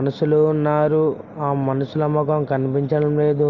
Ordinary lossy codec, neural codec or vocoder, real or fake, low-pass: Opus, 32 kbps; none; real; 7.2 kHz